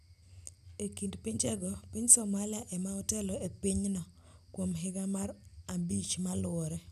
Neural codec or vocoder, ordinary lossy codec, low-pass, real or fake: none; none; 14.4 kHz; real